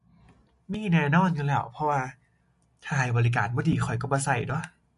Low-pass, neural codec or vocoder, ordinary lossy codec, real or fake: 10.8 kHz; none; MP3, 48 kbps; real